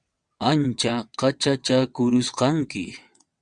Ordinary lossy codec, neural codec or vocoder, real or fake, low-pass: Opus, 64 kbps; vocoder, 22.05 kHz, 80 mel bands, WaveNeXt; fake; 9.9 kHz